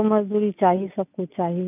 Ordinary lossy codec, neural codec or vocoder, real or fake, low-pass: none; none; real; 3.6 kHz